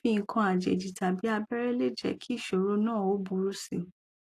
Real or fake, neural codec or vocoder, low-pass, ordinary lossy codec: real; none; 14.4 kHz; AAC, 48 kbps